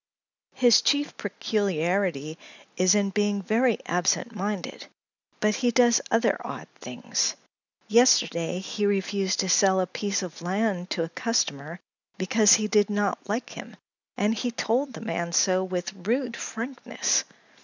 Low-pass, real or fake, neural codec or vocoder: 7.2 kHz; real; none